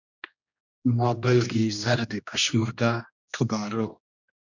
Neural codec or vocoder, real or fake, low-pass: codec, 16 kHz, 1 kbps, X-Codec, HuBERT features, trained on general audio; fake; 7.2 kHz